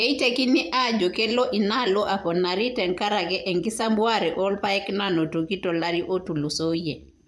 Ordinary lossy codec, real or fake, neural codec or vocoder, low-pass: none; fake; vocoder, 24 kHz, 100 mel bands, Vocos; none